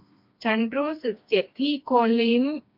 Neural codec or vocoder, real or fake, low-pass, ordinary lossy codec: codec, 16 kHz, 2 kbps, FreqCodec, smaller model; fake; 5.4 kHz; MP3, 48 kbps